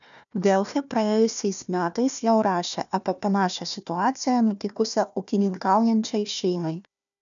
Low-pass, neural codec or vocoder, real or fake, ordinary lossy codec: 7.2 kHz; codec, 16 kHz, 1 kbps, FunCodec, trained on Chinese and English, 50 frames a second; fake; MP3, 96 kbps